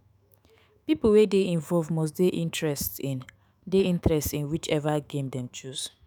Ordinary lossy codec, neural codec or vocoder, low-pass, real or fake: none; autoencoder, 48 kHz, 128 numbers a frame, DAC-VAE, trained on Japanese speech; none; fake